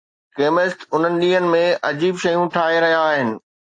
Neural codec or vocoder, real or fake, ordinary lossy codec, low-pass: none; real; AAC, 48 kbps; 9.9 kHz